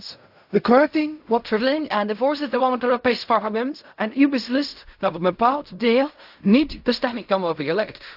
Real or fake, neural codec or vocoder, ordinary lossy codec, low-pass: fake; codec, 16 kHz in and 24 kHz out, 0.4 kbps, LongCat-Audio-Codec, fine tuned four codebook decoder; AAC, 48 kbps; 5.4 kHz